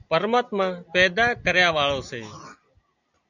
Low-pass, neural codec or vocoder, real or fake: 7.2 kHz; none; real